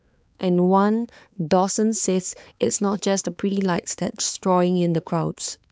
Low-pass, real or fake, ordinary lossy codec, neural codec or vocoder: none; fake; none; codec, 16 kHz, 4 kbps, X-Codec, HuBERT features, trained on balanced general audio